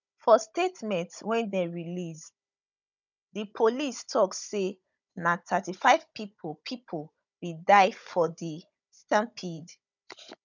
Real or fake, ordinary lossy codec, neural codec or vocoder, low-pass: fake; none; codec, 16 kHz, 16 kbps, FunCodec, trained on Chinese and English, 50 frames a second; 7.2 kHz